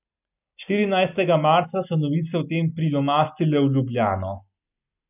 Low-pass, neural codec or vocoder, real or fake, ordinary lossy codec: 3.6 kHz; none; real; none